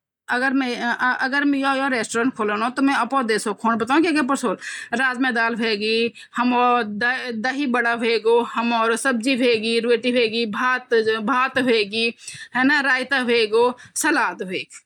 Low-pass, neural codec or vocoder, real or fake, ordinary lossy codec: 19.8 kHz; none; real; none